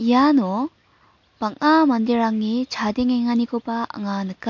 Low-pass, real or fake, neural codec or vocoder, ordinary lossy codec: 7.2 kHz; real; none; MP3, 48 kbps